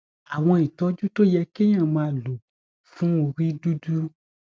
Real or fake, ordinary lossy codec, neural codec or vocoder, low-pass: real; none; none; none